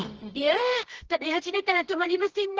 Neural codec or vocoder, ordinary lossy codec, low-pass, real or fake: codec, 24 kHz, 0.9 kbps, WavTokenizer, medium music audio release; Opus, 16 kbps; 7.2 kHz; fake